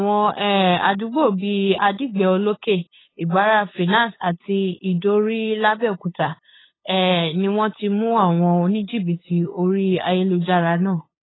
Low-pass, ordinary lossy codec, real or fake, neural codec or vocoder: 7.2 kHz; AAC, 16 kbps; fake; codec, 16 kHz, 8 kbps, FunCodec, trained on LibriTTS, 25 frames a second